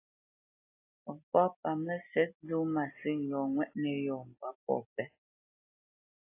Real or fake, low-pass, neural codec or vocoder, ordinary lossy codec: real; 3.6 kHz; none; AAC, 24 kbps